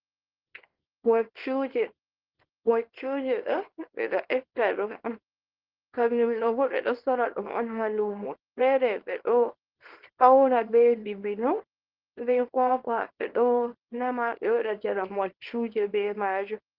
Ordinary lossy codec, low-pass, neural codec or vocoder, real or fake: Opus, 16 kbps; 5.4 kHz; codec, 24 kHz, 0.9 kbps, WavTokenizer, small release; fake